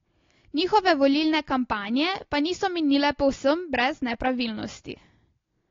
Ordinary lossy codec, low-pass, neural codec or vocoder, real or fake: AAC, 32 kbps; 7.2 kHz; none; real